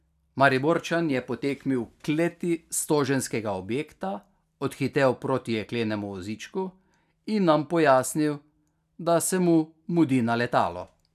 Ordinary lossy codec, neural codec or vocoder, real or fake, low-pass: none; none; real; 14.4 kHz